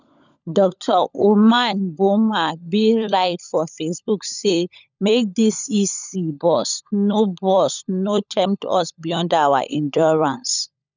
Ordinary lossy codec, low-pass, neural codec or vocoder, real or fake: none; 7.2 kHz; codec, 16 kHz, 16 kbps, FunCodec, trained on LibriTTS, 50 frames a second; fake